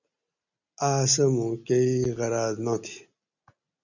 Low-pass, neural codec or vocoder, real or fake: 7.2 kHz; none; real